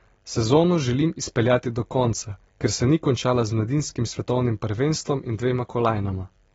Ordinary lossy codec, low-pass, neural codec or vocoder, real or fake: AAC, 24 kbps; 19.8 kHz; none; real